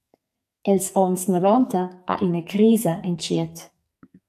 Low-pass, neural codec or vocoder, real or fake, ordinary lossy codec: 14.4 kHz; codec, 44.1 kHz, 2.6 kbps, SNAC; fake; AAC, 96 kbps